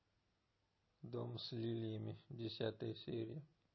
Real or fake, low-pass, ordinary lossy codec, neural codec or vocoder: real; 7.2 kHz; MP3, 24 kbps; none